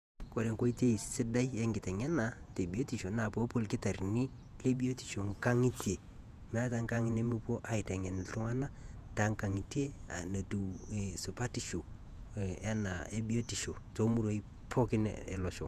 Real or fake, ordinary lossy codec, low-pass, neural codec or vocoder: fake; none; 14.4 kHz; vocoder, 48 kHz, 128 mel bands, Vocos